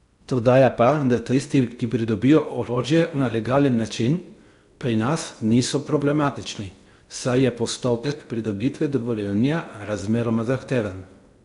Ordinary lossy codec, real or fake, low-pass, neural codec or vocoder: none; fake; 10.8 kHz; codec, 16 kHz in and 24 kHz out, 0.6 kbps, FocalCodec, streaming, 4096 codes